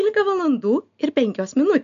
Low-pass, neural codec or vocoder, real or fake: 7.2 kHz; none; real